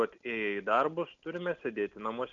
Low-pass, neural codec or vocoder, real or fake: 7.2 kHz; none; real